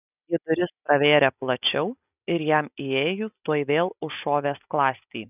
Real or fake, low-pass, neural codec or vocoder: real; 3.6 kHz; none